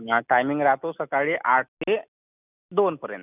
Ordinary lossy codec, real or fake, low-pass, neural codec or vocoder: none; real; 3.6 kHz; none